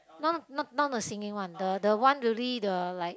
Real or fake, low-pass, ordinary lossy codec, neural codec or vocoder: real; none; none; none